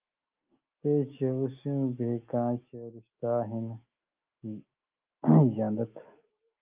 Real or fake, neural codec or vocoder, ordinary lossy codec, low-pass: fake; autoencoder, 48 kHz, 128 numbers a frame, DAC-VAE, trained on Japanese speech; Opus, 24 kbps; 3.6 kHz